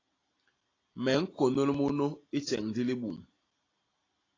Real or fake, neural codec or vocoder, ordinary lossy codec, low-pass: real; none; AAC, 32 kbps; 7.2 kHz